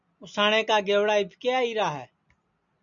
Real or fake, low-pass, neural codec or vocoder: real; 7.2 kHz; none